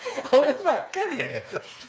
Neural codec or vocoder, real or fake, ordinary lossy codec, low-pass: codec, 16 kHz, 4 kbps, FreqCodec, smaller model; fake; none; none